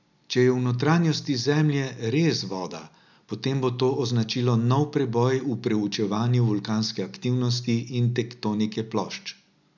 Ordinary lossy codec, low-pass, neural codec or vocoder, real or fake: none; 7.2 kHz; none; real